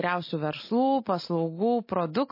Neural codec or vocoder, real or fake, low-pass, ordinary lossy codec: none; real; 5.4 kHz; MP3, 24 kbps